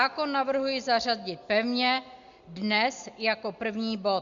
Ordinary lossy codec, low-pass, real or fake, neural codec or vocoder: Opus, 64 kbps; 7.2 kHz; real; none